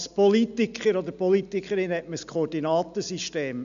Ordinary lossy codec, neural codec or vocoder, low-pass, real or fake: none; none; 7.2 kHz; real